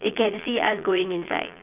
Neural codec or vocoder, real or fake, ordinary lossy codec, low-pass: vocoder, 22.05 kHz, 80 mel bands, Vocos; fake; none; 3.6 kHz